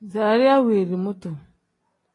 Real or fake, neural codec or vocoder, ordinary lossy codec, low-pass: real; none; AAC, 32 kbps; 10.8 kHz